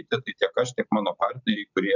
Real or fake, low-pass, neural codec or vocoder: fake; 7.2 kHz; vocoder, 44.1 kHz, 128 mel bands every 256 samples, BigVGAN v2